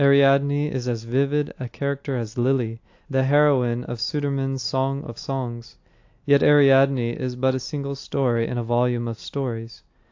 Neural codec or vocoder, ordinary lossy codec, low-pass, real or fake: none; AAC, 48 kbps; 7.2 kHz; real